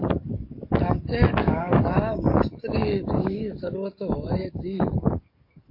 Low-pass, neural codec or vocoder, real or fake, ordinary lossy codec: 5.4 kHz; codec, 44.1 kHz, 7.8 kbps, DAC; fake; MP3, 32 kbps